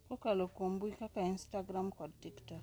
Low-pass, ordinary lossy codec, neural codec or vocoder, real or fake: none; none; none; real